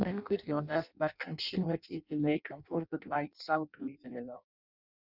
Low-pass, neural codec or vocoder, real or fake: 5.4 kHz; codec, 16 kHz in and 24 kHz out, 0.6 kbps, FireRedTTS-2 codec; fake